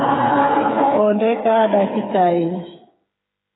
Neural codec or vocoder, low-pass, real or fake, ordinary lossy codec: codec, 16 kHz, 8 kbps, FreqCodec, smaller model; 7.2 kHz; fake; AAC, 16 kbps